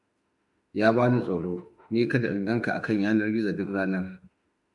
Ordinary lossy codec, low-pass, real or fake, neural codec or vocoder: MP3, 64 kbps; 10.8 kHz; fake; autoencoder, 48 kHz, 32 numbers a frame, DAC-VAE, trained on Japanese speech